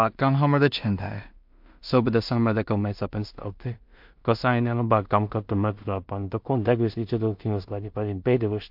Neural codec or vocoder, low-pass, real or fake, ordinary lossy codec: codec, 16 kHz in and 24 kHz out, 0.4 kbps, LongCat-Audio-Codec, two codebook decoder; 5.4 kHz; fake; MP3, 48 kbps